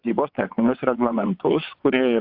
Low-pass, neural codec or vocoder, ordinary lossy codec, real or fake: 5.4 kHz; vocoder, 22.05 kHz, 80 mel bands, WaveNeXt; AAC, 48 kbps; fake